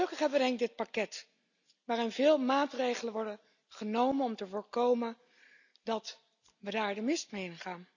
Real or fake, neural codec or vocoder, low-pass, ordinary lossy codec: real; none; 7.2 kHz; none